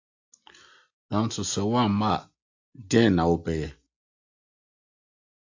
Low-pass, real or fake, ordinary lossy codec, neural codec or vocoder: 7.2 kHz; real; AAC, 48 kbps; none